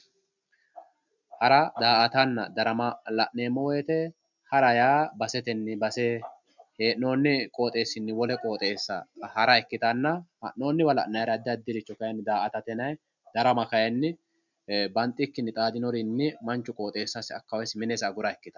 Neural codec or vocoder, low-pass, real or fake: none; 7.2 kHz; real